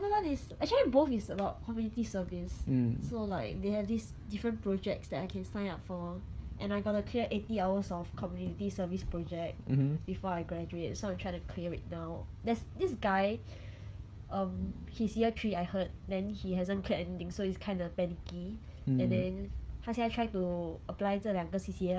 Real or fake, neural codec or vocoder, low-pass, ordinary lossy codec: fake; codec, 16 kHz, 16 kbps, FreqCodec, smaller model; none; none